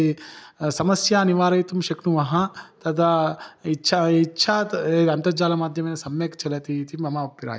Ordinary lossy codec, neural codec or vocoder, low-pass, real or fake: none; none; none; real